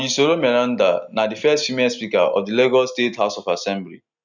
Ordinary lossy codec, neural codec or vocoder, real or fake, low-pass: none; none; real; 7.2 kHz